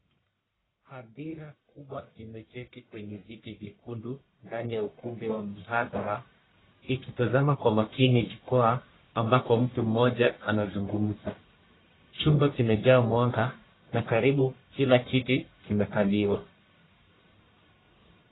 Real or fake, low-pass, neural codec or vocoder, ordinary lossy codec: fake; 7.2 kHz; codec, 44.1 kHz, 1.7 kbps, Pupu-Codec; AAC, 16 kbps